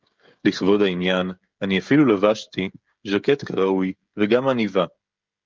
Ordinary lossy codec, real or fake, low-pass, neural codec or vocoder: Opus, 24 kbps; fake; 7.2 kHz; codec, 16 kHz, 16 kbps, FreqCodec, smaller model